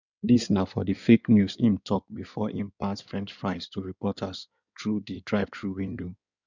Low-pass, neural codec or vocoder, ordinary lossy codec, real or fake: 7.2 kHz; codec, 16 kHz in and 24 kHz out, 2.2 kbps, FireRedTTS-2 codec; none; fake